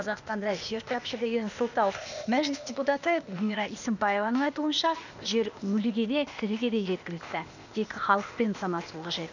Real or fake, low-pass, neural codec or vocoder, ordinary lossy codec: fake; 7.2 kHz; codec, 16 kHz, 0.8 kbps, ZipCodec; none